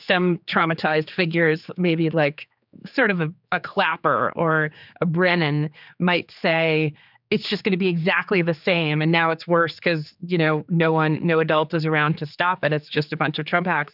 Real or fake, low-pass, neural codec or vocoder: fake; 5.4 kHz; codec, 16 kHz, 4 kbps, X-Codec, HuBERT features, trained on general audio